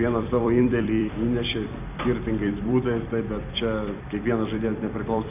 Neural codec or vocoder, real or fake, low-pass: vocoder, 44.1 kHz, 128 mel bands every 256 samples, BigVGAN v2; fake; 3.6 kHz